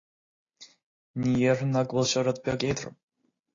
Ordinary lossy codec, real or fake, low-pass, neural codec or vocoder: AAC, 32 kbps; real; 7.2 kHz; none